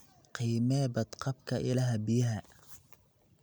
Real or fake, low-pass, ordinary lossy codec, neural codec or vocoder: real; none; none; none